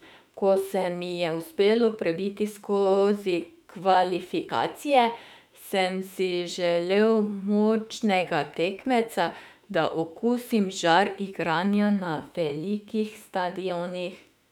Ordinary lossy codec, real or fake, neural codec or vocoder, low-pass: none; fake; autoencoder, 48 kHz, 32 numbers a frame, DAC-VAE, trained on Japanese speech; 19.8 kHz